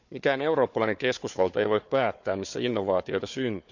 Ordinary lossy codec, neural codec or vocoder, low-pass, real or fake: none; codec, 16 kHz, 4 kbps, FunCodec, trained on Chinese and English, 50 frames a second; 7.2 kHz; fake